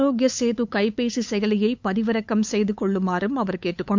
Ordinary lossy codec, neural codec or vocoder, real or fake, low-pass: MP3, 64 kbps; codec, 16 kHz, 8 kbps, FunCodec, trained on LibriTTS, 25 frames a second; fake; 7.2 kHz